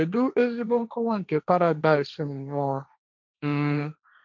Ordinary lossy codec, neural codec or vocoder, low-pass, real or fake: none; codec, 16 kHz, 1.1 kbps, Voila-Tokenizer; 7.2 kHz; fake